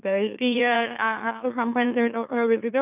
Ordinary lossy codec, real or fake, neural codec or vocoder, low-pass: none; fake; autoencoder, 44.1 kHz, a latent of 192 numbers a frame, MeloTTS; 3.6 kHz